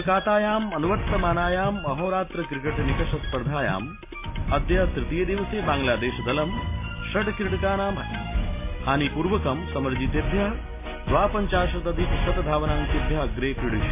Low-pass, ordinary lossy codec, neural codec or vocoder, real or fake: 3.6 kHz; MP3, 24 kbps; none; real